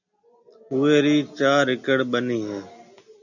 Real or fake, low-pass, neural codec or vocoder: real; 7.2 kHz; none